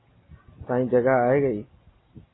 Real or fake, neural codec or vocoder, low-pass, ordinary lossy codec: real; none; 7.2 kHz; AAC, 16 kbps